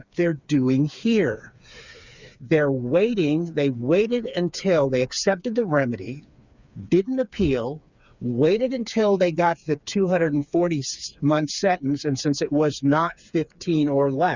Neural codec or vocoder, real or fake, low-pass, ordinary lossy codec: codec, 16 kHz, 4 kbps, FreqCodec, smaller model; fake; 7.2 kHz; Opus, 64 kbps